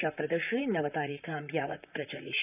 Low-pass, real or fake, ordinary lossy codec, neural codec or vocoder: 3.6 kHz; fake; none; vocoder, 44.1 kHz, 128 mel bands, Pupu-Vocoder